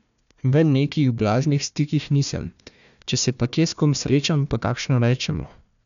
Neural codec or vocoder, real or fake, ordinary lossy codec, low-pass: codec, 16 kHz, 1 kbps, FunCodec, trained on Chinese and English, 50 frames a second; fake; none; 7.2 kHz